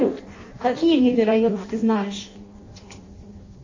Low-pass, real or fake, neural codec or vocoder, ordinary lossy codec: 7.2 kHz; fake; codec, 16 kHz in and 24 kHz out, 0.6 kbps, FireRedTTS-2 codec; MP3, 32 kbps